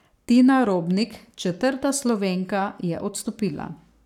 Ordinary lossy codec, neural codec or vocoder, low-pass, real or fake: none; codec, 44.1 kHz, 7.8 kbps, Pupu-Codec; 19.8 kHz; fake